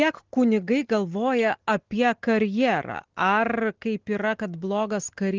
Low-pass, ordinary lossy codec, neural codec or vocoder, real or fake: 7.2 kHz; Opus, 16 kbps; none; real